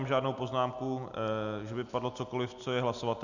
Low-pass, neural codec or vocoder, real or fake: 7.2 kHz; none; real